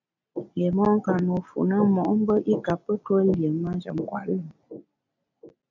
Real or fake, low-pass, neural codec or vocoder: real; 7.2 kHz; none